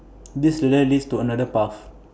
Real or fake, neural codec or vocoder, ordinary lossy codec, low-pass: real; none; none; none